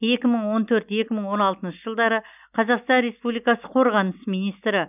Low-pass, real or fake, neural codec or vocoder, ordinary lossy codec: 3.6 kHz; real; none; none